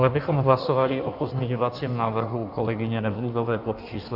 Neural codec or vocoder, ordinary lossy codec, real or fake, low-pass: codec, 16 kHz in and 24 kHz out, 1.1 kbps, FireRedTTS-2 codec; AAC, 48 kbps; fake; 5.4 kHz